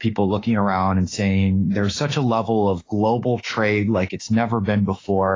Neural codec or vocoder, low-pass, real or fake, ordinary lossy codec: autoencoder, 48 kHz, 32 numbers a frame, DAC-VAE, trained on Japanese speech; 7.2 kHz; fake; AAC, 32 kbps